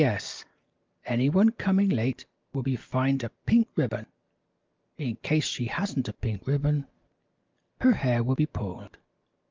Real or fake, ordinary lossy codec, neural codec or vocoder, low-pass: real; Opus, 32 kbps; none; 7.2 kHz